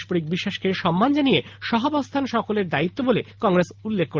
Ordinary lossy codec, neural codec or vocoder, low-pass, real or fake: Opus, 32 kbps; none; 7.2 kHz; real